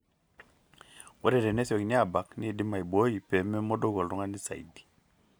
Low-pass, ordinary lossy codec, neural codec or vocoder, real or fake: none; none; none; real